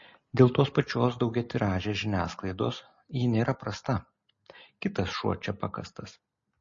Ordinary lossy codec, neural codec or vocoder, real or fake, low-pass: MP3, 32 kbps; none; real; 7.2 kHz